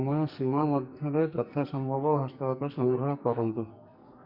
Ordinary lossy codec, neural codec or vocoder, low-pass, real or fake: Opus, 64 kbps; codec, 44.1 kHz, 2.6 kbps, SNAC; 5.4 kHz; fake